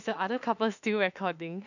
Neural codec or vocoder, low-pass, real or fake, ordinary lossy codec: autoencoder, 48 kHz, 32 numbers a frame, DAC-VAE, trained on Japanese speech; 7.2 kHz; fake; none